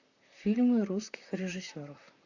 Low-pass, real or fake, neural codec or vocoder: 7.2 kHz; fake; vocoder, 44.1 kHz, 128 mel bands, Pupu-Vocoder